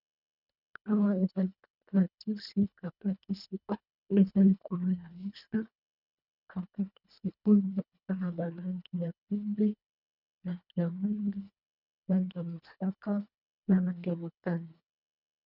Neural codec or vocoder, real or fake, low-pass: codec, 24 kHz, 1.5 kbps, HILCodec; fake; 5.4 kHz